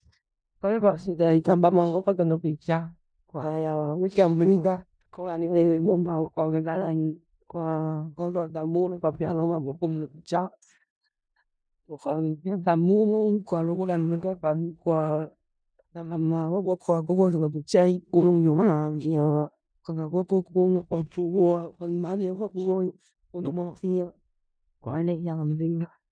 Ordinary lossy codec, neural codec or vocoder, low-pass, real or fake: none; codec, 16 kHz in and 24 kHz out, 0.4 kbps, LongCat-Audio-Codec, four codebook decoder; 9.9 kHz; fake